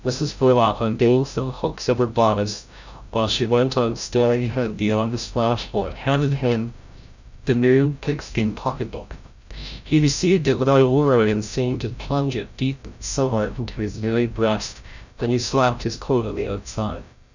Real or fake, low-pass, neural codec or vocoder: fake; 7.2 kHz; codec, 16 kHz, 0.5 kbps, FreqCodec, larger model